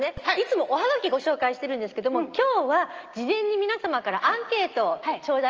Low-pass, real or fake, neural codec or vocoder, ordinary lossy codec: 7.2 kHz; real; none; Opus, 24 kbps